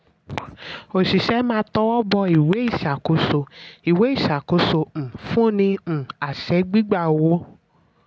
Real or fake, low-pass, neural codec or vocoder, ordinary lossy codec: real; none; none; none